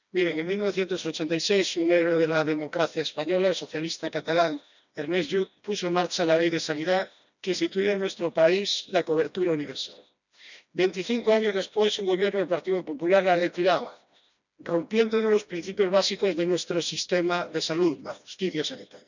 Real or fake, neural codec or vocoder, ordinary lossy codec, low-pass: fake; codec, 16 kHz, 1 kbps, FreqCodec, smaller model; none; 7.2 kHz